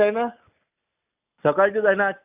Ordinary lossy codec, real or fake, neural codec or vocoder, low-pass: AAC, 32 kbps; real; none; 3.6 kHz